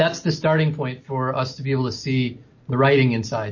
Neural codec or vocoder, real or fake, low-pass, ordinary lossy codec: none; real; 7.2 kHz; MP3, 32 kbps